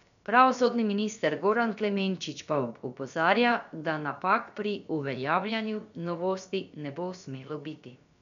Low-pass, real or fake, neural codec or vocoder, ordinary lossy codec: 7.2 kHz; fake; codec, 16 kHz, about 1 kbps, DyCAST, with the encoder's durations; none